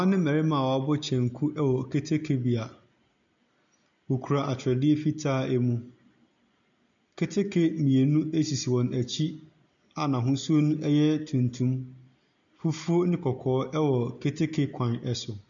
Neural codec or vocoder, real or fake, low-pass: none; real; 7.2 kHz